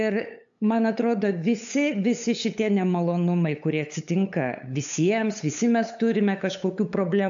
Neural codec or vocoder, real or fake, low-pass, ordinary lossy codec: codec, 16 kHz, 8 kbps, FunCodec, trained on LibriTTS, 25 frames a second; fake; 7.2 kHz; AAC, 64 kbps